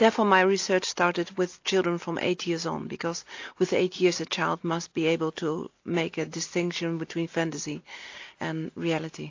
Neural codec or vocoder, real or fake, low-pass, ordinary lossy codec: none; real; 7.2 kHz; AAC, 48 kbps